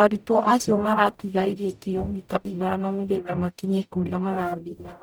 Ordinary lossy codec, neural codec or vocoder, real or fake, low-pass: none; codec, 44.1 kHz, 0.9 kbps, DAC; fake; none